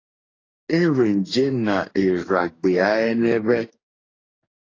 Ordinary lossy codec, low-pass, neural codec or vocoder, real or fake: AAC, 32 kbps; 7.2 kHz; codec, 44.1 kHz, 2.6 kbps, DAC; fake